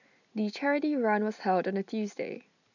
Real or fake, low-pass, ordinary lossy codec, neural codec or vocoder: real; 7.2 kHz; none; none